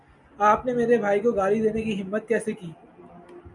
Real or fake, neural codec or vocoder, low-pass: real; none; 10.8 kHz